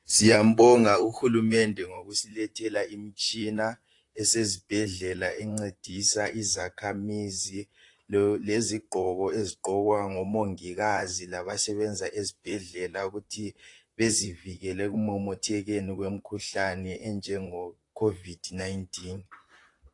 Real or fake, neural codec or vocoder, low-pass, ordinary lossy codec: fake; vocoder, 44.1 kHz, 128 mel bands, Pupu-Vocoder; 10.8 kHz; AAC, 48 kbps